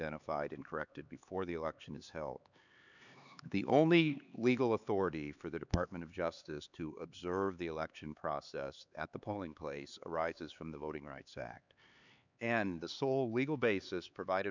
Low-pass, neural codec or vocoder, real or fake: 7.2 kHz; codec, 16 kHz, 4 kbps, X-Codec, HuBERT features, trained on LibriSpeech; fake